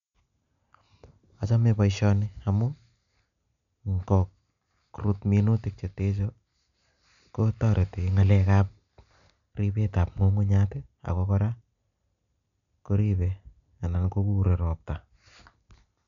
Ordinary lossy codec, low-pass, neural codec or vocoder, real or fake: none; 7.2 kHz; none; real